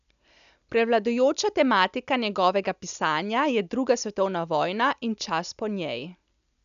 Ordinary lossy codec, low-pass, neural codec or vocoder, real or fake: none; 7.2 kHz; none; real